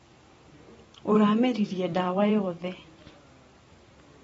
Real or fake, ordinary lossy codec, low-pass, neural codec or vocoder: fake; AAC, 24 kbps; 19.8 kHz; vocoder, 44.1 kHz, 128 mel bands every 512 samples, BigVGAN v2